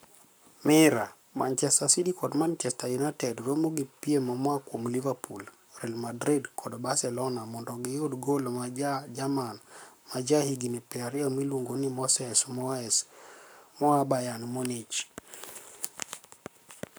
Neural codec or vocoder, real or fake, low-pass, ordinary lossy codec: codec, 44.1 kHz, 7.8 kbps, Pupu-Codec; fake; none; none